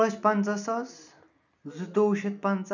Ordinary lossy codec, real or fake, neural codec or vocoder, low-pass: none; real; none; 7.2 kHz